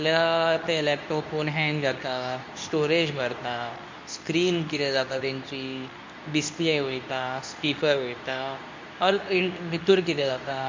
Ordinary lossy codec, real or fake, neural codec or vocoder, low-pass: MP3, 64 kbps; fake; codec, 24 kHz, 0.9 kbps, WavTokenizer, medium speech release version 2; 7.2 kHz